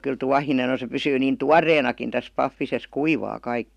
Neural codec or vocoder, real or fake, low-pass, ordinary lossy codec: none; real; 14.4 kHz; none